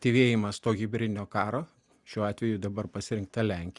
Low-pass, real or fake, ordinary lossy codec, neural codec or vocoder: 10.8 kHz; real; Opus, 64 kbps; none